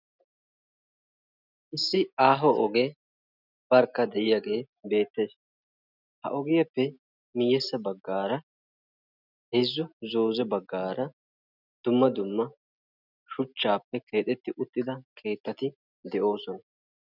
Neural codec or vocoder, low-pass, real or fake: none; 5.4 kHz; real